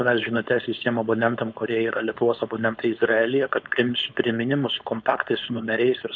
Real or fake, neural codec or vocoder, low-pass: fake; codec, 16 kHz, 4.8 kbps, FACodec; 7.2 kHz